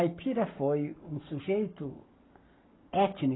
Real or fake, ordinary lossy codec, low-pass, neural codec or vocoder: fake; AAC, 16 kbps; 7.2 kHz; codec, 44.1 kHz, 7.8 kbps, Pupu-Codec